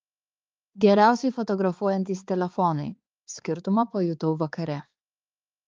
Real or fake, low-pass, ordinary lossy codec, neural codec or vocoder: fake; 7.2 kHz; Opus, 24 kbps; codec, 16 kHz, 4 kbps, X-Codec, HuBERT features, trained on balanced general audio